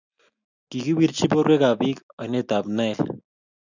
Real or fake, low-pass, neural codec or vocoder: real; 7.2 kHz; none